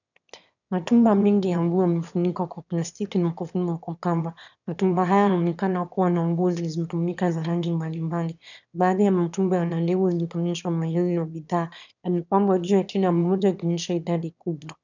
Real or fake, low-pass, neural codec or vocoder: fake; 7.2 kHz; autoencoder, 22.05 kHz, a latent of 192 numbers a frame, VITS, trained on one speaker